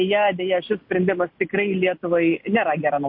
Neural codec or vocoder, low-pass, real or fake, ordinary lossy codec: none; 5.4 kHz; real; MP3, 32 kbps